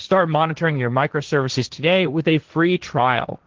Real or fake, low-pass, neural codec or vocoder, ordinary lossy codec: fake; 7.2 kHz; codec, 16 kHz, 1.1 kbps, Voila-Tokenizer; Opus, 16 kbps